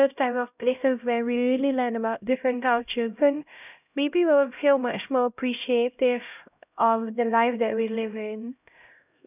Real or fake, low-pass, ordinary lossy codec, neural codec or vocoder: fake; 3.6 kHz; none; codec, 16 kHz, 0.5 kbps, X-Codec, HuBERT features, trained on LibriSpeech